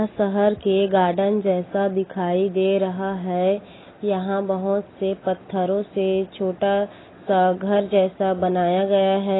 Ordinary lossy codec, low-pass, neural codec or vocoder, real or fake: AAC, 16 kbps; 7.2 kHz; none; real